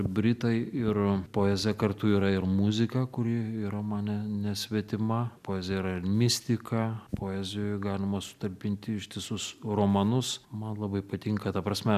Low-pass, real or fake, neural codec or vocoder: 14.4 kHz; real; none